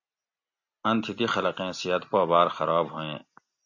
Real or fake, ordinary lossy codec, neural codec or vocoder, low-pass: real; MP3, 48 kbps; none; 7.2 kHz